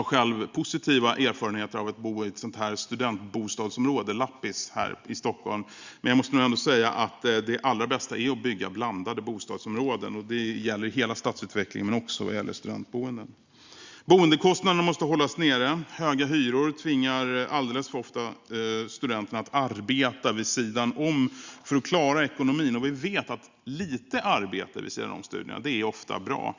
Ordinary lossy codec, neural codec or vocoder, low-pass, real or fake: Opus, 64 kbps; none; 7.2 kHz; real